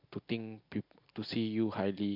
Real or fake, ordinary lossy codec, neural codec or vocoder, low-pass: real; MP3, 48 kbps; none; 5.4 kHz